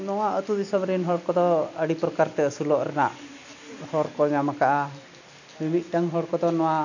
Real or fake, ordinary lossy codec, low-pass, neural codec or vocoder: real; none; 7.2 kHz; none